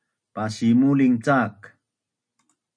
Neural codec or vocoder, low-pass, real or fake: none; 9.9 kHz; real